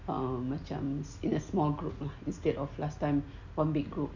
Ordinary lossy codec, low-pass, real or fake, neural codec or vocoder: none; 7.2 kHz; real; none